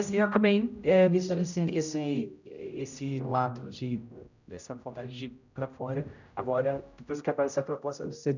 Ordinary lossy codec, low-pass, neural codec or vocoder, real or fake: none; 7.2 kHz; codec, 16 kHz, 0.5 kbps, X-Codec, HuBERT features, trained on general audio; fake